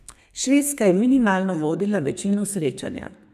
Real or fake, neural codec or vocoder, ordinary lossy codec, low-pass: fake; codec, 32 kHz, 1.9 kbps, SNAC; none; 14.4 kHz